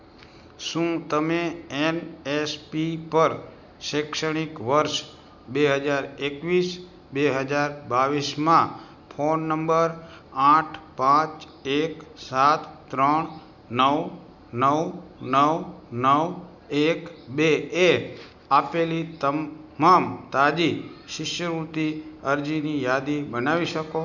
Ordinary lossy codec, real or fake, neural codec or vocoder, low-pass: none; real; none; 7.2 kHz